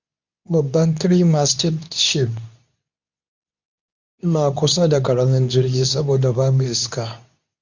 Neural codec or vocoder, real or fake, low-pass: codec, 24 kHz, 0.9 kbps, WavTokenizer, medium speech release version 2; fake; 7.2 kHz